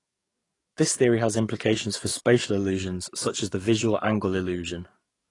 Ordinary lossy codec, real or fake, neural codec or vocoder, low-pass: AAC, 32 kbps; fake; codec, 44.1 kHz, 7.8 kbps, DAC; 10.8 kHz